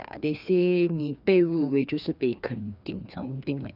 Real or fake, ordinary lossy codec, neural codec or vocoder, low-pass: fake; none; codec, 16 kHz, 2 kbps, FreqCodec, larger model; 5.4 kHz